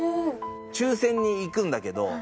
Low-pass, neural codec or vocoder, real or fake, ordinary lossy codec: none; none; real; none